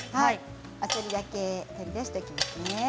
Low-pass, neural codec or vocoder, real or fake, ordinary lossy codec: none; none; real; none